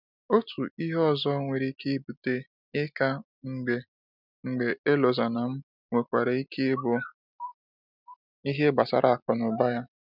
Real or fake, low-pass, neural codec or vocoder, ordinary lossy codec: real; 5.4 kHz; none; none